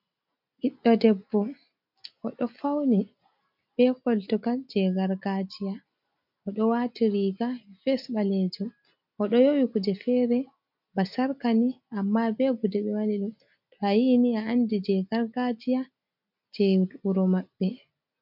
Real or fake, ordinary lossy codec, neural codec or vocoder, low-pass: real; MP3, 48 kbps; none; 5.4 kHz